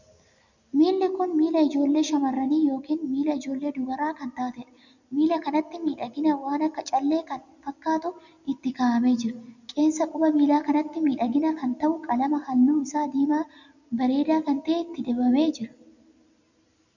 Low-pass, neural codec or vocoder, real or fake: 7.2 kHz; none; real